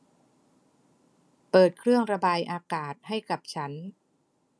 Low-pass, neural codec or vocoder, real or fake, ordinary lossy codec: none; none; real; none